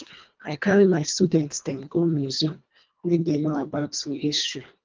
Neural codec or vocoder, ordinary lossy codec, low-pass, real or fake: codec, 24 kHz, 1.5 kbps, HILCodec; Opus, 24 kbps; 7.2 kHz; fake